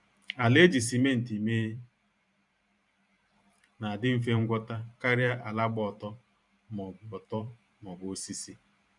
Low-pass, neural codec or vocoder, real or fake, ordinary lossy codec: 10.8 kHz; vocoder, 44.1 kHz, 128 mel bands every 512 samples, BigVGAN v2; fake; none